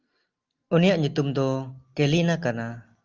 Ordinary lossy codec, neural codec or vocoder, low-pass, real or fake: Opus, 32 kbps; none; 7.2 kHz; real